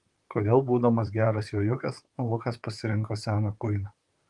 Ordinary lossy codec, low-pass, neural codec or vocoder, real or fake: Opus, 24 kbps; 10.8 kHz; vocoder, 44.1 kHz, 128 mel bands, Pupu-Vocoder; fake